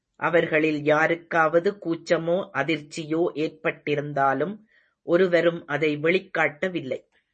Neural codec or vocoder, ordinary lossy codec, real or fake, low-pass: none; MP3, 32 kbps; real; 10.8 kHz